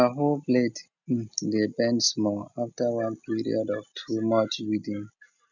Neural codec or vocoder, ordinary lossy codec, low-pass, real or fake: none; none; 7.2 kHz; real